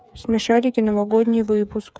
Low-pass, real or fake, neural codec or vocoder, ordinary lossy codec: none; fake; codec, 16 kHz, 8 kbps, FreqCodec, smaller model; none